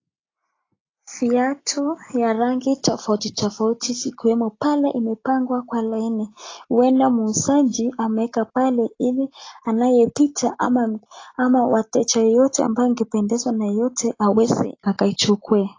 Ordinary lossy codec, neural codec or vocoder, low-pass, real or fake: AAC, 32 kbps; none; 7.2 kHz; real